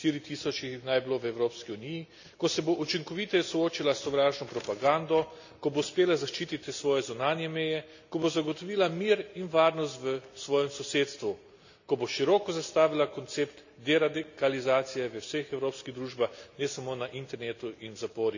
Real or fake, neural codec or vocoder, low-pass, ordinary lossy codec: real; none; 7.2 kHz; none